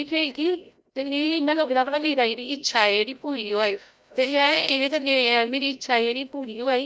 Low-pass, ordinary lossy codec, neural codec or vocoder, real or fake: none; none; codec, 16 kHz, 0.5 kbps, FreqCodec, larger model; fake